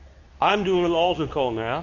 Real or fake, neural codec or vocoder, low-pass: fake; codec, 24 kHz, 0.9 kbps, WavTokenizer, medium speech release version 2; 7.2 kHz